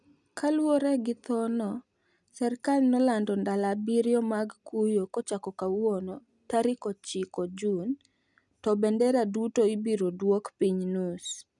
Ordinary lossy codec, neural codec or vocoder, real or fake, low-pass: none; none; real; 10.8 kHz